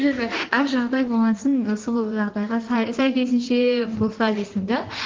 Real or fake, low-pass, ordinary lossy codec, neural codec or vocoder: fake; 7.2 kHz; Opus, 16 kbps; codec, 16 kHz in and 24 kHz out, 1.1 kbps, FireRedTTS-2 codec